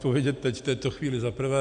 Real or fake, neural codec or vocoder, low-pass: real; none; 9.9 kHz